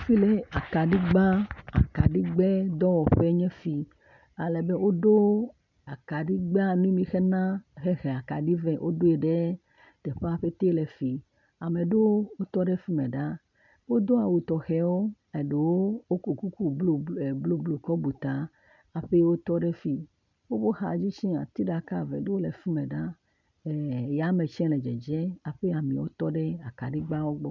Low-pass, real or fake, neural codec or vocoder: 7.2 kHz; real; none